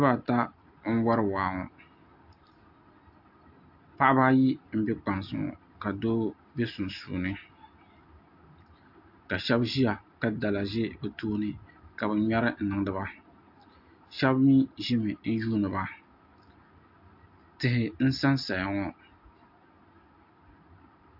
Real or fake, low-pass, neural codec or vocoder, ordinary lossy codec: real; 5.4 kHz; none; AAC, 48 kbps